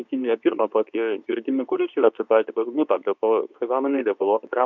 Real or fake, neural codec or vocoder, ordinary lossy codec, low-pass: fake; codec, 24 kHz, 0.9 kbps, WavTokenizer, medium speech release version 2; MP3, 64 kbps; 7.2 kHz